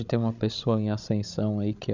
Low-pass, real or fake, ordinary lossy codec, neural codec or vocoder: 7.2 kHz; fake; none; codec, 16 kHz, 16 kbps, FreqCodec, larger model